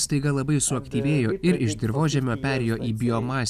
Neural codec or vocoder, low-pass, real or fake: none; 14.4 kHz; real